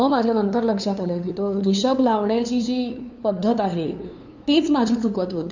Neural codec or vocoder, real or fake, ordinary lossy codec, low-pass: codec, 16 kHz, 2 kbps, FunCodec, trained on LibriTTS, 25 frames a second; fake; none; 7.2 kHz